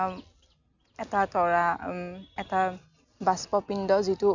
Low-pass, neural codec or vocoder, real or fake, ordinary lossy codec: 7.2 kHz; none; real; none